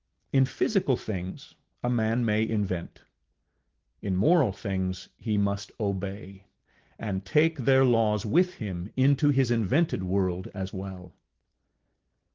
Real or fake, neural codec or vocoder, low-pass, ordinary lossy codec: real; none; 7.2 kHz; Opus, 16 kbps